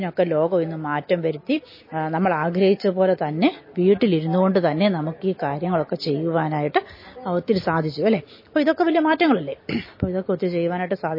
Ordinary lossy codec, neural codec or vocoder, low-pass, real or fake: MP3, 24 kbps; none; 5.4 kHz; real